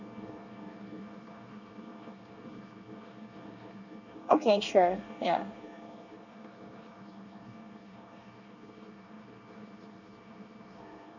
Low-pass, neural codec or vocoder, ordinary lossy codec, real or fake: 7.2 kHz; codec, 24 kHz, 1 kbps, SNAC; none; fake